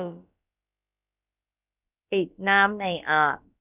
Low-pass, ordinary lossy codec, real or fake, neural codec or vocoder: 3.6 kHz; none; fake; codec, 16 kHz, about 1 kbps, DyCAST, with the encoder's durations